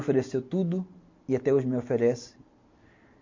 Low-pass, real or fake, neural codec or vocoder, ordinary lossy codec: 7.2 kHz; real; none; MP3, 48 kbps